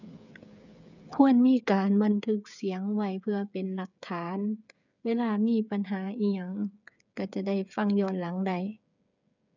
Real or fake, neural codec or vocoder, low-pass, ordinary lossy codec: fake; codec, 16 kHz, 8 kbps, FreqCodec, smaller model; 7.2 kHz; none